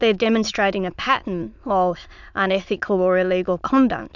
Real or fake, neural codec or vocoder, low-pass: fake; autoencoder, 22.05 kHz, a latent of 192 numbers a frame, VITS, trained on many speakers; 7.2 kHz